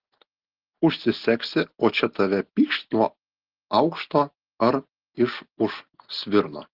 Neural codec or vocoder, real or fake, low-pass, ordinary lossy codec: none; real; 5.4 kHz; Opus, 16 kbps